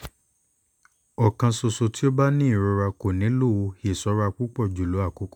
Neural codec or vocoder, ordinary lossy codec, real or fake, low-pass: none; none; real; 19.8 kHz